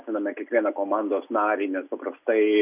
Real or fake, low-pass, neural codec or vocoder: real; 3.6 kHz; none